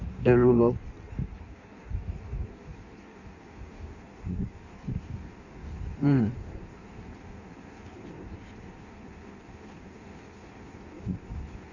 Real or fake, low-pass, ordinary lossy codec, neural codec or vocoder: fake; 7.2 kHz; none; codec, 16 kHz in and 24 kHz out, 1.1 kbps, FireRedTTS-2 codec